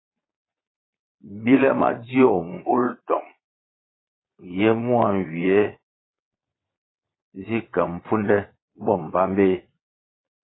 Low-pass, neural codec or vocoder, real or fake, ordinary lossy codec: 7.2 kHz; vocoder, 22.05 kHz, 80 mel bands, Vocos; fake; AAC, 16 kbps